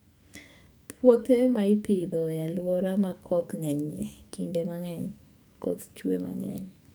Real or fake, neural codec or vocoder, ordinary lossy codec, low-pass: fake; codec, 44.1 kHz, 2.6 kbps, SNAC; none; none